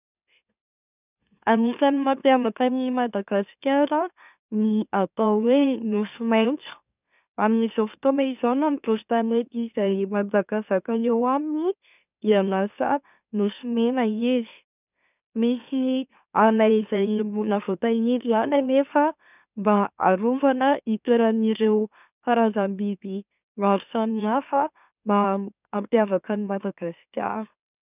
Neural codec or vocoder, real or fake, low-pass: autoencoder, 44.1 kHz, a latent of 192 numbers a frame, MeloTTS; fake; 3.6 kHz